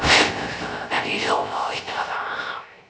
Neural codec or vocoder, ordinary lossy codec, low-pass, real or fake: codec, 16 kHz, 0.3 kbps, FocalCodec; none; none; fake